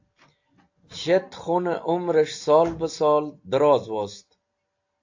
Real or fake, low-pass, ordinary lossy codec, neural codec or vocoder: real; 7.2 kHz; AAC, 48 kbps; none